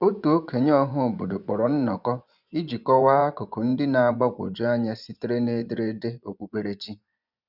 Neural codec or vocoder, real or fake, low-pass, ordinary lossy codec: vocoder, 24 kHz, 100 mel bands, Vocos; fake; 5.4 kHz; none